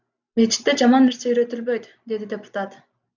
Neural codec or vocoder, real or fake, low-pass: none; real; 7.2 kHz